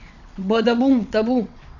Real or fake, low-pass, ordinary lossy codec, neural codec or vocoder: fake; 7.2 kHz; none; vocoder, 22.05 kHz, 80 mel bands, WaveNeXt